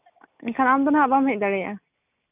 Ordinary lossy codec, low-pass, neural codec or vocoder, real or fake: none; 3.6 kHz; none; real